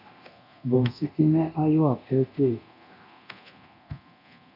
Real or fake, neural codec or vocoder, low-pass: fake; codec, 24 kHz, 0.9 kbps, DualCodec; 5.4 kHz